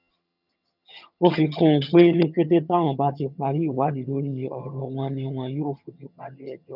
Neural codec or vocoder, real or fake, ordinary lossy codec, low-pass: vocoder, 22.05 kHz, 80 mel bands, HiFi-GAN; fake; none; 5.4 kHz